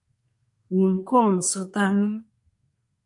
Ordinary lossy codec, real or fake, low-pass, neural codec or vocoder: MP3, 64 kbps; fake; 10.8 kHz; codec, 24 kHz, 1 kbps, SNAC